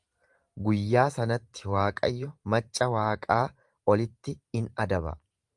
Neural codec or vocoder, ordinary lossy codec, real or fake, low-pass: vocoder, 44.1 kHz, 128 mel bands every 512 samples, BigVGAN v2; Opus, 32 kbps; fake; 10.8 kHz